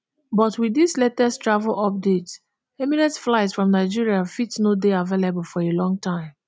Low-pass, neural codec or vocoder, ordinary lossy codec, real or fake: none; none; none; real